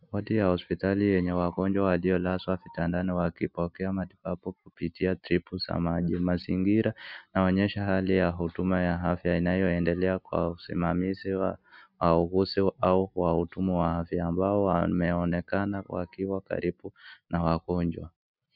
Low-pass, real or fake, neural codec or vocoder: 5.4 kHz; real; none